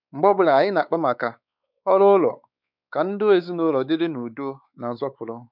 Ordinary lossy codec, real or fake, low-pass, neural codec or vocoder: none; fake; 5.4 kHz; codec, 16 kHz, 4 kbps, X-Codec, WavLM features, trained on Multilingual LibriSpeech